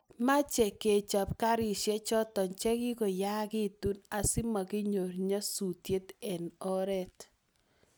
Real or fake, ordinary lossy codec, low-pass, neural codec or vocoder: real; none; none; none